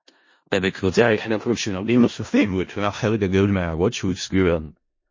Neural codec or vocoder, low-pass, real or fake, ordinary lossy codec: codec, 16 kHz in and 24 kHz out, 0.4 kbps, LongCat-Audio-Codec, four codebook decoder; 7.2 kHz; fake; MP3, 32 kbps